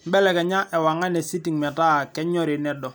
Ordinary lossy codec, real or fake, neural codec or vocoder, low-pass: none; real; none; none